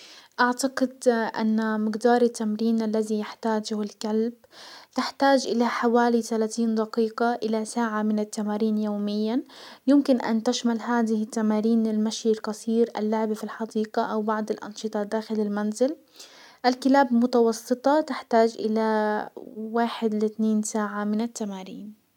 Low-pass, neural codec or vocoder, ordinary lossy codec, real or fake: 19.8 kHz; none; none; real